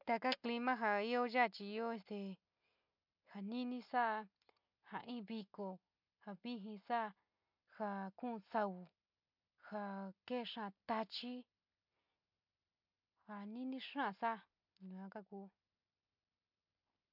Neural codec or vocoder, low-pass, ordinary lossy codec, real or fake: none; 5.4 kHz; MP3, 48 kbps; real